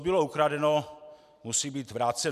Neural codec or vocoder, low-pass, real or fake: none; 14.4 kHz; real